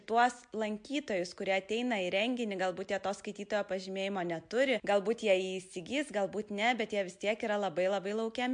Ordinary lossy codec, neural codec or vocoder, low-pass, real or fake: MP3, 64 kbps; none; 9.9 kHz; real